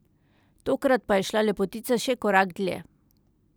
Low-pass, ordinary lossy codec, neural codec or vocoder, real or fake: none; none; none; real